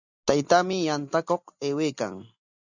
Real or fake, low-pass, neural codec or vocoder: real; 7.2 kHz; none